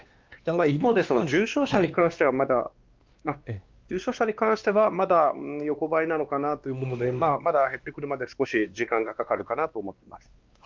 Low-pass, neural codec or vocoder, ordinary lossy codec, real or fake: 7.2 kHz; codec, 16 kHz, 2 kbps, X-Codec, WavLM features, trained on Multilingual LibriSpeech; Opus, 32 kbps; fake